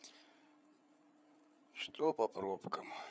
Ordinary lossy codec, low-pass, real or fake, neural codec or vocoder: none; none; fake; codec, 16 kHz, 8 kbps, FreqCodec, larger model